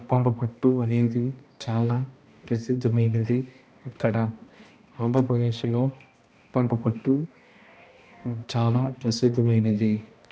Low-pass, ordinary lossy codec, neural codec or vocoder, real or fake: none; none; codec, 16 kHz, 1 kbps, X-Codec, HuBERT features, trained on balanced general audio; fake